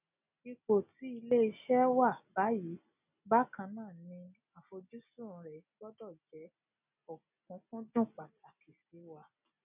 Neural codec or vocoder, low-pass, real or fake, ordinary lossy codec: none; 3.6 kHz; real; none